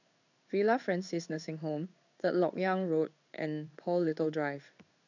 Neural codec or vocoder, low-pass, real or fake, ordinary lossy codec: codec, 16 kHz in and 24 kHz out, 1 kbps, XY-Tokenizer; 7.2 kHz; fake; none